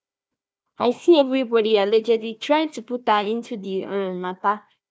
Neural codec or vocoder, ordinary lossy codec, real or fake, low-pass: codec, 16 kHz, 1 kbps, FunCodec, trained on Chinese and English, 50 frames a second; none; fake; none